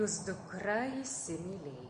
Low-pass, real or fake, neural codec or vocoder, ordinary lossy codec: 9.9 kHz; real; none; MP3, 48 kbps